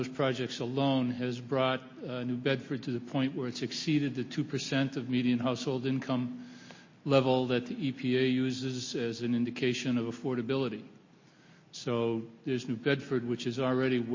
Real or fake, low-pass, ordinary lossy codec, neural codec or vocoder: real; 7.2 kHz; MP3, 32 kbps; none